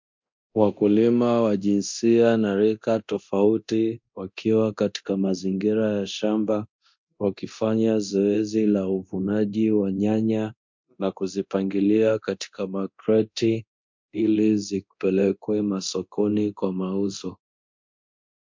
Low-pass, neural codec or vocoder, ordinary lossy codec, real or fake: 7.2 kHz; codec, 24 kHz, 0.9 kbps, DualCodec; MP3, 48 kbps; fake